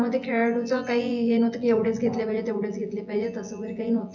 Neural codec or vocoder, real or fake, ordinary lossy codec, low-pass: vocoder, 24 kHz, 100 mel bands, Vocos; fake; none; 7.2 kHz